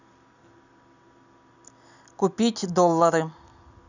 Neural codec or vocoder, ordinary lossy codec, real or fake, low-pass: none; none; real; 7.2 kHz